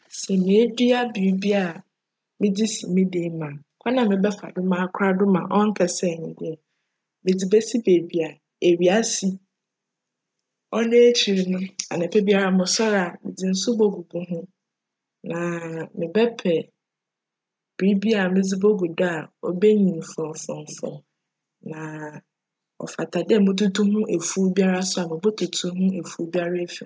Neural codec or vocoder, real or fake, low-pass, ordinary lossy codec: none; real; none; none